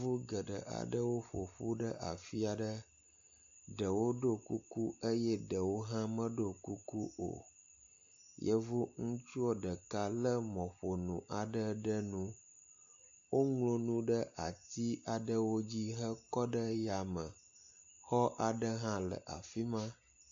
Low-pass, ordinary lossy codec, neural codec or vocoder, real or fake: 7.2 kHz; AAC, 48 kbps; none; real